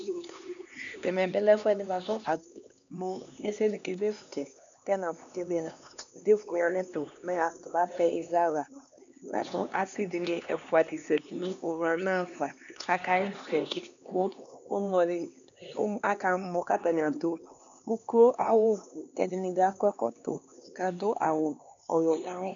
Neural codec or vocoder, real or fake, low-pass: codec, 16 kHz, 2 kbps, X-Codec, HuBERT features, trained on LibriSpeech; fake; 7.2 kHz